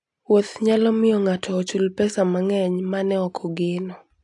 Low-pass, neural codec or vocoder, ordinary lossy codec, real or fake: 10.8 kHz; none; AAC, 64 kbps; real